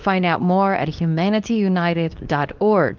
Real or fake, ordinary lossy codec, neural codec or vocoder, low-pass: fake; Opus, 24 kbps; codec, 24 kHz, 0.9 kbps, WavTokenizer, medium speech release version 2; 7.2 kHz